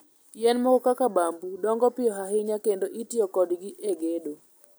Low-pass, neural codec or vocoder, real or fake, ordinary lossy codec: none; none; real; none